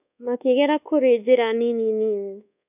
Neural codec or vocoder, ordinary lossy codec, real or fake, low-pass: codec, 24 kHz, 1.2 kbps, DualCodec; none; fake; 3.6 kHz